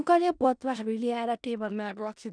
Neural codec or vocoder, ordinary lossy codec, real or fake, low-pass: codec, 16 kHz in and 24 kHz out, 0.4 kbps, LongCat-Audio-Codec, four codebook decoder; none; fake; 9.9 kHz